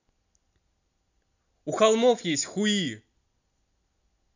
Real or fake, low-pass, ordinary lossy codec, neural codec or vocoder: real; 7.2 kHz; none; none